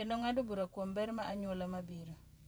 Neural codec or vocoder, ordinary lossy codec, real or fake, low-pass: vocoder, 44.1 kHz, 128 mel bands every 512 samples, BigVGAN v2; none; fake; none